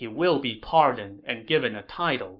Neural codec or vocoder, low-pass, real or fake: codec, 44.1 kHz, 7.8 kbps, Pupu-Codec; 5.4 kHz; fake